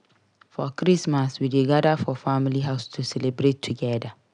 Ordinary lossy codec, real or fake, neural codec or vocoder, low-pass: none; real; none; 9.9 kHz